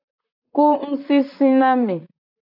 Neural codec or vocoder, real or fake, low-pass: vocoder, 44.1 kHz, 128 mel bands, Pupu-Vocoder; fake; 5.4 kHz